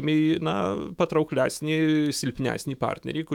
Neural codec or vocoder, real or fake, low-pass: autoencoder, 48 kHz, 128 numbers a frame, DAC-VAE, trained on Japanese speech; fake; 19.8 kHz